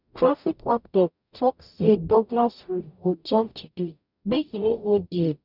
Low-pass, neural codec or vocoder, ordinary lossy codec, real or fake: 5.4 kHz; codec, 44.1 kHz, 0.9 kbps, DAC; none; fake